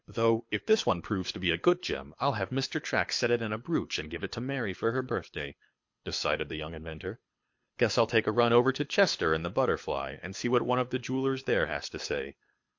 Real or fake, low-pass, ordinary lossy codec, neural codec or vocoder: fake; 7.2 kHz; MP3, 48 kbps; codec, 24 kHz, 6 kbps, HILCodec